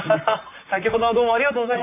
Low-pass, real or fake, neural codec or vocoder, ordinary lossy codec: 3.6 kHz; fake; vocoder, 44.1 kHz, 128 mel bands, Pupu-Vocoder; none